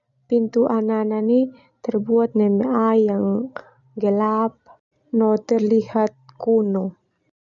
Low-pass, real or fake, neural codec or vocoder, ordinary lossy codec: 7.2 kHz; real; none; none